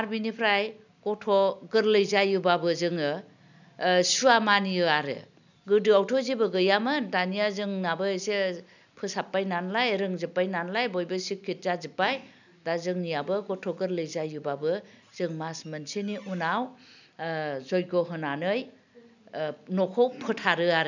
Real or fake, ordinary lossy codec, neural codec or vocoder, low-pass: real; none; none; 7.2 kHz